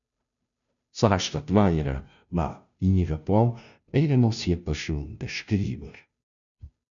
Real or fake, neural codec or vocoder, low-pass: fake; codec, 16 kHz, 0.5 kbps, FunCodec, trained on Chinese and English, 25 frames a second; 7.2 kHz